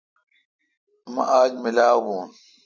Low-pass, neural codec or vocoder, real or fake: 7.2 kHz; none; real